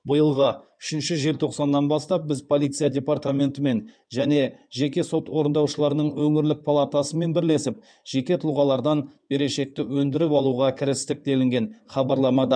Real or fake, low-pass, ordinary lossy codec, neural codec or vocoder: fake; 9.9 kHz; none; codec, 16 kHz in and 24 kHz out, 2.2 kbps, FireRedTTS-2 codec